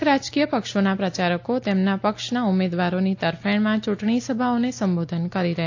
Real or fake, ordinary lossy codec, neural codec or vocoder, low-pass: real; AAC, 48 kbps; none; 7.2 kHz